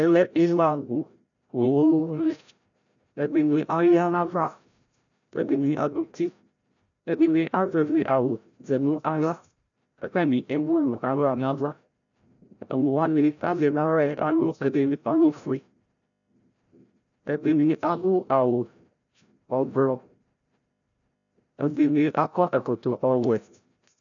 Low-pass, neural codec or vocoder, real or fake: 7.2 kHz; codec, 16 kHz, 0.5 kbps, FreqCodec, larger model; fake